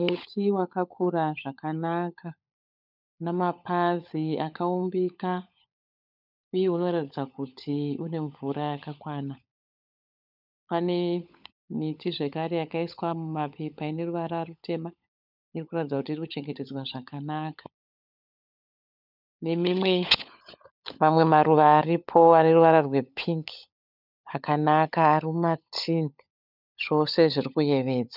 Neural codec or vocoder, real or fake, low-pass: codec, 16 kHz, 16 kbps, FunCodec, trained on LibriTTS, 50 frames a second; fake; 5.4 kHz